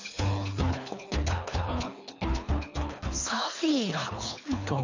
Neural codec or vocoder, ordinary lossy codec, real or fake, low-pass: codec, 24 kHz, 3 kbps, HILCodec; AAC, 48 kbps; fake; 7.2 kHz